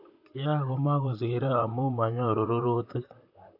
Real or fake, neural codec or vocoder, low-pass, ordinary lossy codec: fake; vocoder, 44.1 kHz, 128 mel bands, Pupu-Vocoder; 5.4 kHz; AAC, 48 kbps